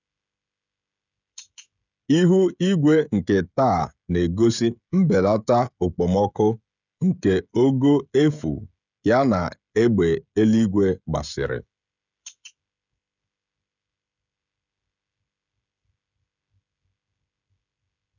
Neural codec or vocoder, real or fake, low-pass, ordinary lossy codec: codec, 16 kHz, 16 kbps, FreqCodec, smaller model; fake; 7.2 kHz; none